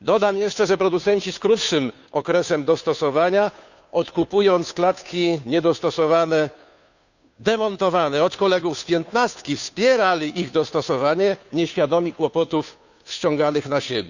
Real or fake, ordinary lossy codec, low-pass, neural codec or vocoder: fake; none; 7.2 kHz; codec, 16 kHz, 2 kbps, FunCodec, trained on Chinese and English, 25 frames a second